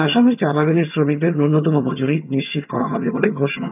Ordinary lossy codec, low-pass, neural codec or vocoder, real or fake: none; 3.6 kHz; vocoder, 22.05 kHz, 80 mel bands, HiFi-GAN; fake